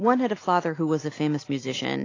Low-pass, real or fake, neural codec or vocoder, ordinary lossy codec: 7.2 kHz; real; none; AAC, 32 kbps